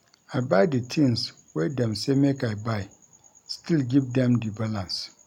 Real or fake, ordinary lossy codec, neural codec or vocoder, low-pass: real; MP3, 96 kbps; none; 19.8 kHz